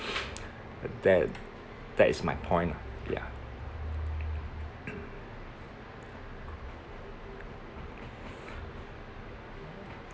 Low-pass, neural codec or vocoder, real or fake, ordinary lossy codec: none; none; real; none